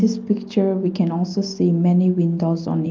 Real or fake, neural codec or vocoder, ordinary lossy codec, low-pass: real; none; Opus, 24 kbps; 7.2 kHz